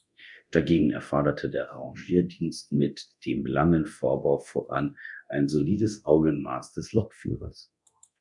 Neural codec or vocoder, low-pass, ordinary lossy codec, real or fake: codec, 24 kHz, 0.9 kbps, DualCodec; 10.8 kHz; MP3, 96 kbps; fake